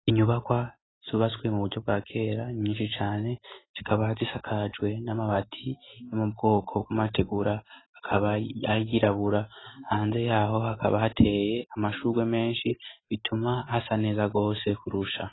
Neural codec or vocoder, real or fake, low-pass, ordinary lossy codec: none; real; 7.2 kHz; AAC, 16 kbps